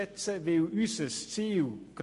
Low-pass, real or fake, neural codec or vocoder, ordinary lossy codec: 14.4 kHz; real; none; MP3, 48 kbps